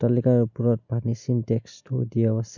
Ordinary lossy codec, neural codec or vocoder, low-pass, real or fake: none; none; 7.2 kHz; real